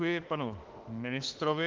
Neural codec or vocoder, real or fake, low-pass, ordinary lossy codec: autoencoder, 48 kHz, 32 numbers a frame, DAC-VAE, trained on Japanese speech; fake; 7.2 kHz; Opus, 16 kbps